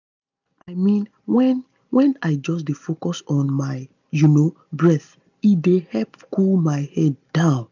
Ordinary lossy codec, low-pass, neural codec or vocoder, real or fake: none; 7.2 kHz; none; real